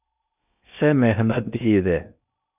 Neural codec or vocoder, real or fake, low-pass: codec, 16 kHz in and 24 kHz out, 0.8 kbps, FocalCodec, streaming, 65536 codes; fake; 3.6 kHz